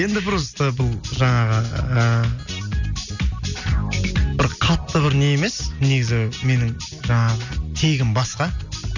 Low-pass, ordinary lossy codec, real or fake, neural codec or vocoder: 7.2 kHz; none; real; none